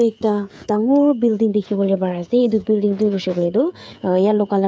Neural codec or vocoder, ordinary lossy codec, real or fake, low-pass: codec, 16 kHz, 16 kbps, FreqCodec, smaller model; none; fake; none